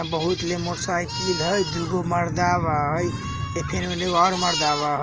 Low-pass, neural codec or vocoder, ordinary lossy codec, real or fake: 7.2 kHz; none; Opus, 24 kbps; real